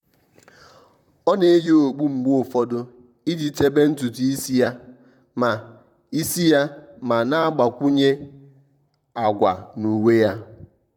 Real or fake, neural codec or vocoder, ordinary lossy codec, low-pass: fake; vocoder, 44.1 kHz, 128 mel bands, Pupu-Vocoder; none; 19.8 kHz